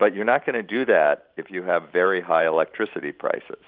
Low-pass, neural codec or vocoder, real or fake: 5.4 kHz; vocoder, 44.1 kHz, 128 mel bands every 512 samples, BigVGAN v2; fake